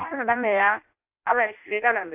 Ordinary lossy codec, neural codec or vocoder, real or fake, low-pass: AAC, 32 kbps; codec, 16 kHz in and 24 kHz out, 0.6 kbps, FireRedTTS-2 codec; fake; 3.6 kHz